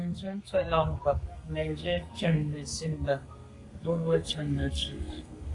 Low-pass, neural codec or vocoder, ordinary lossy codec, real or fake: 10.8 kHz; codec, 32 kHz, 1.9 kbps, SNAC; AAC, 48 kbps; fake